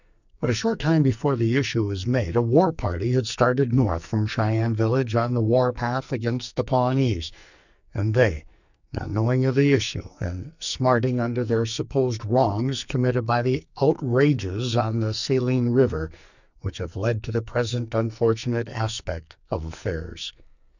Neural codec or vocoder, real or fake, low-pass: codec, 44.1 kHz, 2.6 kbps, SNAC; fake; 7.2 kHz